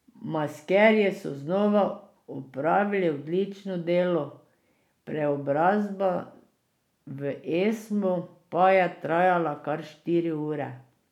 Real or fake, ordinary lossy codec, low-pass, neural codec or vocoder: real; none; 19.8 kHz; none